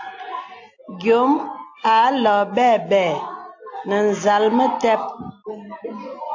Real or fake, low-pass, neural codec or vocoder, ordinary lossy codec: real; 7.2 kHz; none; AAC, 48 kbps